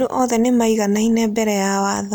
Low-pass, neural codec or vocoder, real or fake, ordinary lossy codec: none; none; real; none